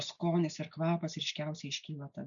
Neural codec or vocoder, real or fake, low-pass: none; real; 7.2 kHz